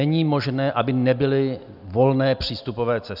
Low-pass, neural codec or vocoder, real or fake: 5.4 kHz; none; real